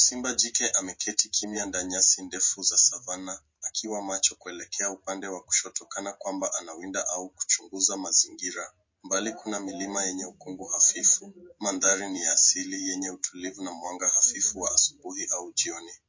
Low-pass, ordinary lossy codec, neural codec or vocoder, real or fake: 7.2 kHz; MP3, 32 kbps; none; real